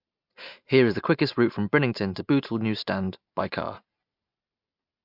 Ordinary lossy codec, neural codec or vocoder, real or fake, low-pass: MP3, 48 kbps; none; real; 5.4 kHz